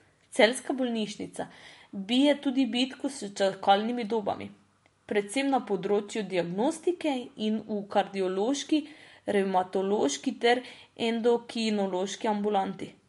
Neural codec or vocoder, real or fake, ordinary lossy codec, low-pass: none; real; MP3, 48 kbps; 14.4 kHz